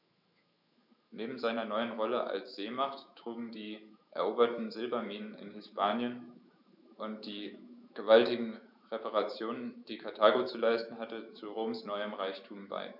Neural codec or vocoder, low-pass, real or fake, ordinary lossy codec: autoencoder, 48 kHz, 128 numbers a frame, DAC-VAE, trained on Japanese speech; 5.4 kHz; fake; none